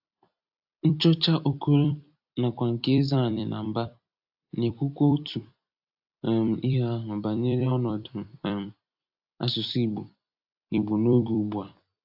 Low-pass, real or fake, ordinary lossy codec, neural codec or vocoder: 5.4 kHz; fake; AAC, 48 kbps; vocoder, 44.1 kHz, 128 mel bands every 256 samples, BigVGAN v2